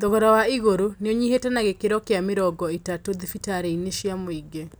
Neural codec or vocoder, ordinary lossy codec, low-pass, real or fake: none; none; none; real